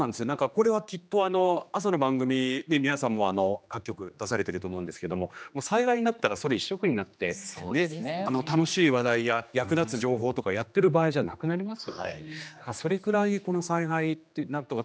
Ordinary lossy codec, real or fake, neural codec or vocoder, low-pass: none; fake; codec, 16 kHz, 2 kbps, X-Codec, HuBERT features, trained on general audio; none